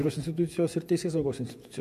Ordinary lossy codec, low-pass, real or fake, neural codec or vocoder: MP3, 96 kbps; 14.4 kHz; fake; vocoder, 48 kHz, 128 mel bands, Vocos